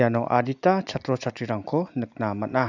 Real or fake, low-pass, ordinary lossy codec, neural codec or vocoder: real; 7.2 kHz; none; none